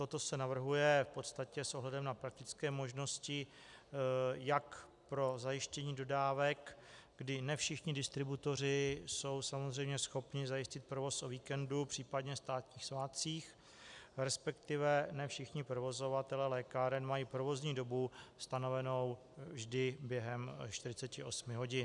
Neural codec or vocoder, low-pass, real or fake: none; 10.8 kHz; real